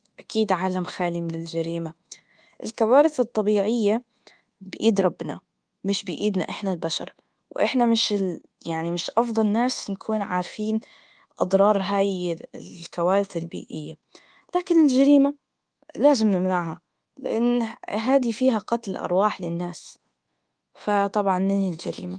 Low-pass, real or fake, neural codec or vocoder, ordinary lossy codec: 9.9 kHz; fake; codec, 24 kHz, 1.2 kbps, DualCodec; Opus, 24 kbps